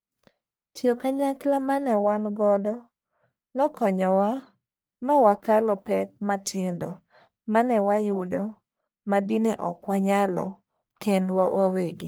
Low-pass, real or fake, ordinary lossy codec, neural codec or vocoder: none; fake; none; codec, 44.1 kHz, 1.7 kbps, Pupu-Codec